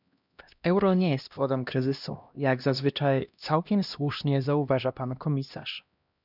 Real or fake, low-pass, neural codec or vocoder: fake; 5.4 kHz; codec, 16 kHz, 1 kbps, X-Codec, HuBERT features, trained on LibriSpeech